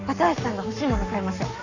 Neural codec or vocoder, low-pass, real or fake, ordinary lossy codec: codec, 44.1 kHz, 7.8 kbps, Pupu-Codec; 7.2 kHz; fake; none